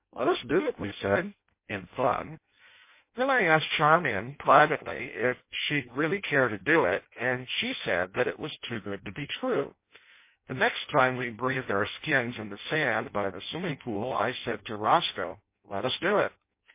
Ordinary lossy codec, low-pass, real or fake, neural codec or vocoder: MP3, 24 kbps; 3.6 kHz; fake; codec, 16 kHz in and 24 kHz out, 0.6 kbps, FireRedTTS-2 codec